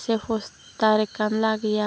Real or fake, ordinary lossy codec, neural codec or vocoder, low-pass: real; none; none; none